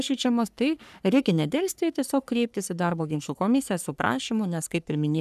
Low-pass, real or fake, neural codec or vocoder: 14.4 kHz; fake; codec, 44.1 kHz, 3.4 kbps, Pupu-Codec